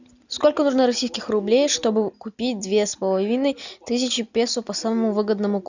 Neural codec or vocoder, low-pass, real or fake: none; 7.2 kHz; real